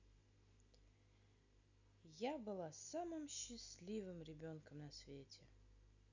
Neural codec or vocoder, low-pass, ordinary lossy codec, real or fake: none; 7.2 kHz; none; real